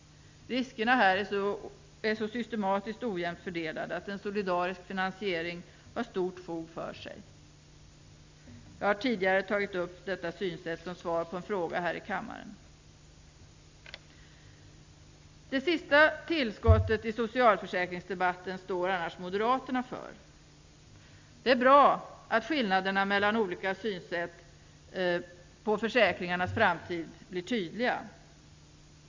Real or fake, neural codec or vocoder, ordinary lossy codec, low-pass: real; none; MP3, 64 kbps; 7.2 kHz